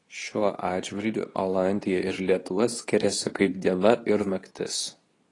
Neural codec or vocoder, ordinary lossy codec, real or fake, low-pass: codec, 24 kHz, 0.9 kbps, WavTokenizer, medium speech release version 2; AAC, 32 kbps; fake; 10.8 kHz